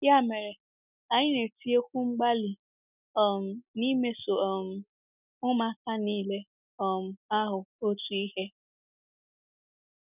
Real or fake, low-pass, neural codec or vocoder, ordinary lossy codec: real; 3.6 kHz; none; none